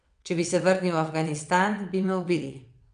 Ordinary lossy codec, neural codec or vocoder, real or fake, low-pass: none; vocoder, 22.05 kHz, 80 mel bands, WaveNeXt; fake; 9.9 kHz